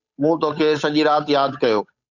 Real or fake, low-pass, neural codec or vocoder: fake; 7.2 kHz; codec, 16 kHz, 8 kbps, FunCodec, trained on Chinese and English, 25 frames a second